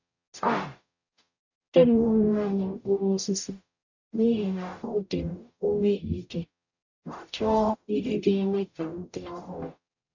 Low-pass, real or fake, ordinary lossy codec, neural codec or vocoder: 7.2 kHz; fake; none; codec, 44.1 kHz, 0.9 kbps, DAC